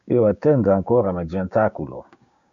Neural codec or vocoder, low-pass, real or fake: codec, 16 kHz, 6 kbps, DAC; 7.2 kHz; fake